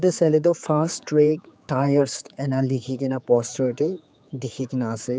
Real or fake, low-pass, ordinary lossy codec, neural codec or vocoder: fake; none; none; codec, 16 kHz, 4 kbps, X-Codec, HuBERT features, trained on general audio